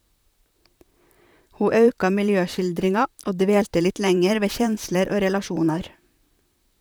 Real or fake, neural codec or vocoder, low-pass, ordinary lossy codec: fake; vocoder, 44.1 kHz, 128 mel bands, Pupu-Vocoder; none; none